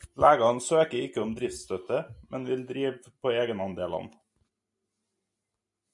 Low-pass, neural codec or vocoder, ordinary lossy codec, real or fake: 10.8 kHz; none; MP3, 96 kbps; real